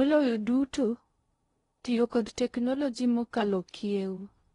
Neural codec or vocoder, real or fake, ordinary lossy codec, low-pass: codec, 16 kHz in and 24 kHz out, 0.6 kbps, FocalCodec, streaming, 4096 codes; fake; AAC, 32 kbps; 10.8 kHz